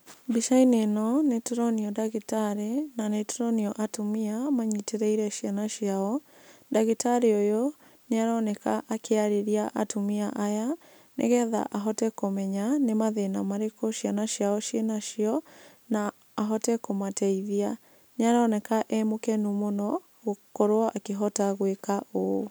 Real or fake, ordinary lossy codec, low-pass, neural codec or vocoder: real; none; none; none